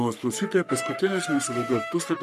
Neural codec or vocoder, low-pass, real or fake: codec, 44.1 kHz, 3.4 kbps, Pupu-Codec; 14.4 kHz; fake